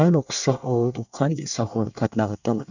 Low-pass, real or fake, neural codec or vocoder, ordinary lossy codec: 7.2 kHz; fake; codec, 24 kHz, 1 kbps, SNAC; none